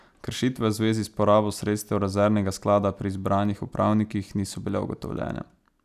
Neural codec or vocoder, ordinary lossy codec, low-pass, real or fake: none; none; 14.4 kHz; real